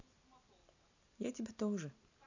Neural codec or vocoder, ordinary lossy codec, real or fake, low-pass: none; AAC, 48 kbps; real; 7.2 kHz